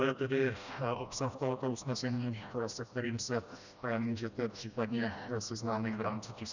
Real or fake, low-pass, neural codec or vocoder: fake; 7.2 kHz; codec, 16 kHz, 1 kbps, FreqCodec, smaller model